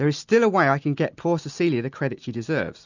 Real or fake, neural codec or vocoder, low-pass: real; none; 7.2 kHz